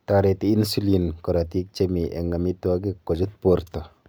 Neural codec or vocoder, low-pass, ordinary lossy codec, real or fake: none; none; none; real